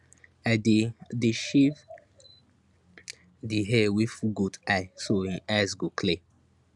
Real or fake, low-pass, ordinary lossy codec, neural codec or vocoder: real; 10.8 kHz; none; none